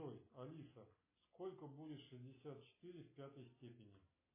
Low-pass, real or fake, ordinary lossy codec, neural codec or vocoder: 3.6 kHz; real; MP3, 16 kbps; none